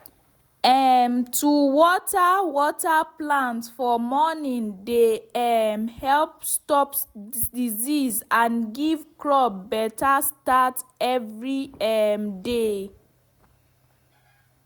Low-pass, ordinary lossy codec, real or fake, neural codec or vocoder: none; none; real; none